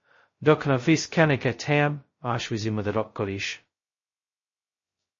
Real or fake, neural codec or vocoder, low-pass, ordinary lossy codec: fake; codec, 16 kHz, 0.2 kbps, FocalCodec; 7.2 kHz; MP3, 32 kbps